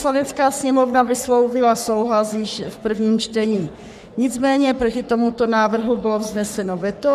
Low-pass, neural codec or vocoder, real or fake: 14.4 kHz; codec, 44.1 kHz, 3.4 kbps, Pupu-Codec; fake